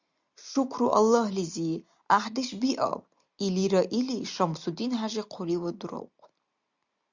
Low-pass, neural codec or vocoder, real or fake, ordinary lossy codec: 7.2 kHz; none; real; Opus, 64 kbps